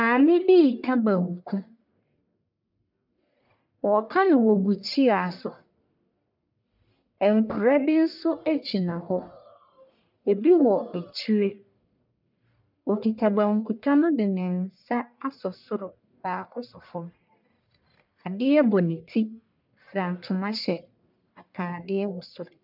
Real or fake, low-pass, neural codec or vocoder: fake; 5.4 kHz; codec, 44.1 kHz, 1.7 kbps, Pupu-Codec